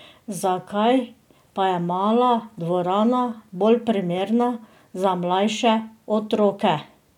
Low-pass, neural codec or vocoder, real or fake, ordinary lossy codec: 19.8 kHz; none; real; none